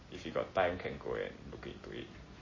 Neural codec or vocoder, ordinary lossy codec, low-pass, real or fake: none; MP3, 32 kbps; 7.2 kHz; real